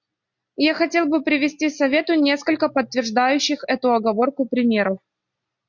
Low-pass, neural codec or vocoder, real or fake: 7.2 kHz; none; real